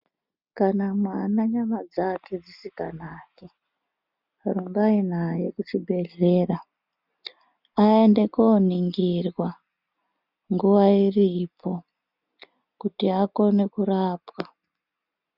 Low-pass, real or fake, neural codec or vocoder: 5.4 kHz; real; none